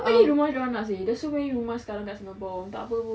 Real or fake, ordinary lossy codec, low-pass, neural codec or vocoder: real; none; none; none